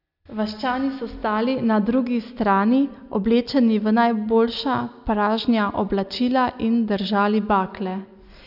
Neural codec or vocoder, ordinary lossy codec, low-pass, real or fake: none; none; 5.4 kHz; real